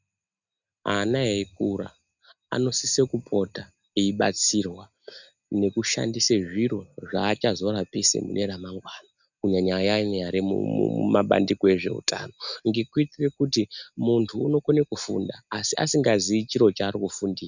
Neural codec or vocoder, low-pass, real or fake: none; 7.2 kHz; real